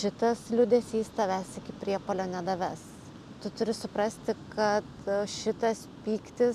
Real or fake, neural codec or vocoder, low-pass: real; none; 14.4 kHz